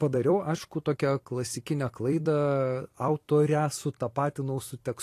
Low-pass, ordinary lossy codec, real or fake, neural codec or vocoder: 14.4 kHz; AAC, 48 kbps; fake; vocoder, 44.1 kHz, 128 mel bands every 256 samples, BigVGAN v2